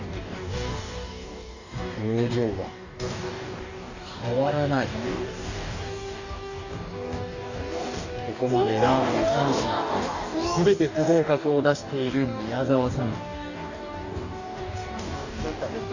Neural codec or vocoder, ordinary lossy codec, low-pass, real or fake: codec, 44.1 kHz, 2.6 kbps, DAC; none; 7.2 kHz; fake